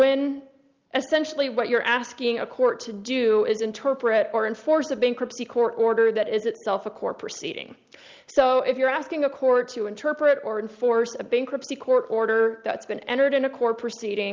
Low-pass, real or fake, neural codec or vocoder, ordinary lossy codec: 7.2 kHz; real; none; Opus, 24 kbps